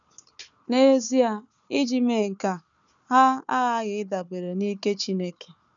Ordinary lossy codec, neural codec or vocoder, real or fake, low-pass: none; codec, 16 kHz, 4 kbps, FunCodec, trained on Chinese and English, 50 frames a second; fake; 7.2 kHz